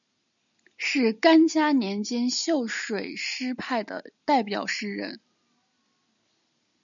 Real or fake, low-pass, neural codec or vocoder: real; 7.2 kHz; none